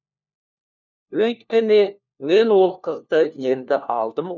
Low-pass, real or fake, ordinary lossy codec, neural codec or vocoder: 7.2 kHz; fake; none; codec, 16 kHz, 1 kbps, FunCodec, trained on LibriTTS, 50 frames a second